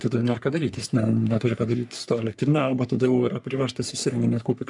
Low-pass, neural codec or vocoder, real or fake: 10.8 kHz; codec, 44.1 kHz, 3.4 kbps, Pupu-Codec; fake